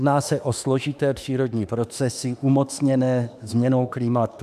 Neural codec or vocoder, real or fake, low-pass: autoencoder, 48 kHz, 32 numbers a frame, DAC-VAE, trained on Japanese speech; fake; 14.4 kHz